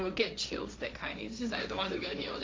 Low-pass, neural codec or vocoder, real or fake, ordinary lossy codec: none; codec, 16 kHz, 1.1 kbps, Voila-Tokenizer; fake; none